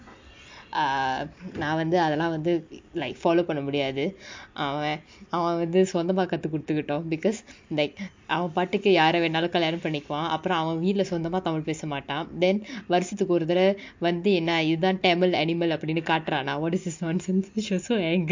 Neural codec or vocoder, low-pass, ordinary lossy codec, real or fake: none; 7.2 kHz; MP3, 48 kbps; real